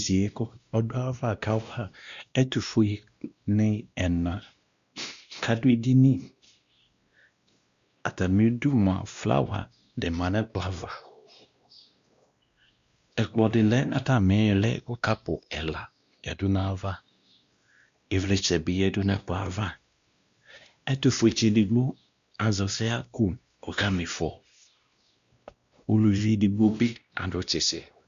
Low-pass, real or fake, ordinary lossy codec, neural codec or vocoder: 7.2 kHz; fake; Opus, 64 kbps; codec, 16 kHz, 1 kbps, X-Codec, WavLM features, trained on Multilingual LibriSpeech